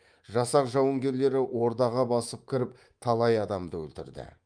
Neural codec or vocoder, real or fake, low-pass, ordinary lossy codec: codec, 24 kHz, 3.1 kbps, DualCodec; fake; 9.9 kHz; Opus, 32 kbps